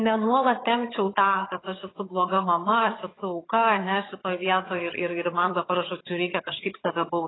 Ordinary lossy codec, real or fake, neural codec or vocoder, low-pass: AAC, 16 kbps; fake; vocoder, 22.05 kHz, 80 mel bands, HiFi-GAN; 7.2 kHz